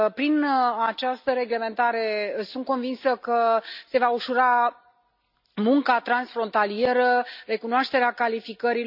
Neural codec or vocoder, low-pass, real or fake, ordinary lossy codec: none; 5.4 kHz; real; none